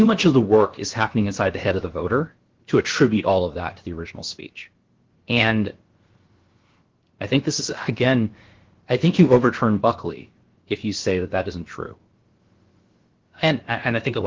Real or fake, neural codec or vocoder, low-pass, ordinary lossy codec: fake; codec, 16 kHz, about 1 kbps, DyCAST, with the encoder's durations; 7.2 kHz; Opus, 16 kbps